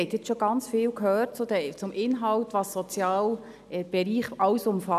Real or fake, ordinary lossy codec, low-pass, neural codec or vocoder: real; none; 14.4 kHz; none